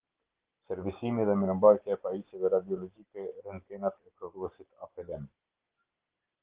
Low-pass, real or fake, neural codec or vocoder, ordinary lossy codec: 3.6 kHz; real; none; Opus, 16 kbps